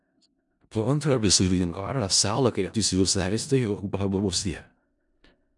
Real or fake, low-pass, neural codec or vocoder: fake; 10.8 kHz; codec, 16 kHz in and 24 kHz out, 0.4 kbps, LongCat-Audio-Codec, four codebook decoder